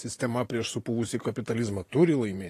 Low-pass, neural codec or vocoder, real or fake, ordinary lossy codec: 14.4 kHz; vocoder, 44.1 kHz, 128 mel bands every 512 samples, BigVGAN v2; fake; AAC, 48 kbps